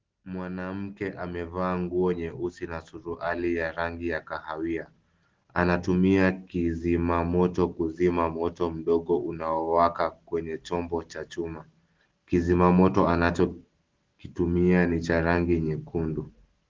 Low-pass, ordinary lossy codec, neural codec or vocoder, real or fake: 7.2 kHz; Opus, 16 kbps; none; real